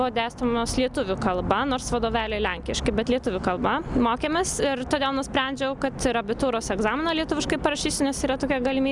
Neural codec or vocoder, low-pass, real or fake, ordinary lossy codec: none; 10.8 kHz; real; Opus, 64 kbps